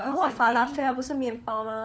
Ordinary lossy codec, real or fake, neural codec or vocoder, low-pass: none; fake; codec, 16 kHz, 4 kbps, FunCodec, trained on LibriTTS, 50 frames a second; none